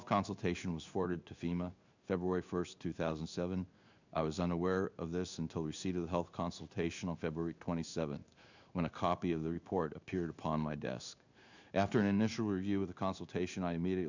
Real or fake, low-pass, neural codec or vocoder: fake; 7.2 kHz; codec, 16 kHz in and 24 kHz out, 1 kbps, XY-Tokenizer